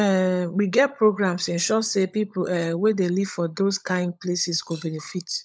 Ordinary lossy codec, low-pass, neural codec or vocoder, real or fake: none; none; codec, 16 kHz, 16 kbps, FunCodec, trained on LibriTTS, 50 frames a second; fake